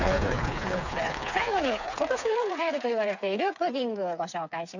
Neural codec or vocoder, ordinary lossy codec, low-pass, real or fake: codec, 16 kHz, 4 kbps, FreqCodec, smaller model; none; 7.2 kHz; fake